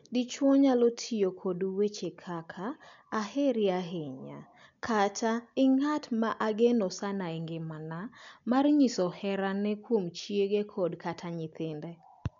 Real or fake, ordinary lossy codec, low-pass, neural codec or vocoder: real; MP3, 64 kbps; 7.2 kHz; none